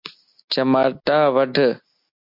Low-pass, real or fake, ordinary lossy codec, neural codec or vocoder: 5.4 kHz; real; MP3, 48 kbps; none